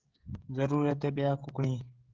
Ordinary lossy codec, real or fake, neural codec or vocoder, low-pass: Opus, 16 kbps; fake; codec, 16 kHz, 16 kbps, FreqCodec, smaller model; 7.2 kHz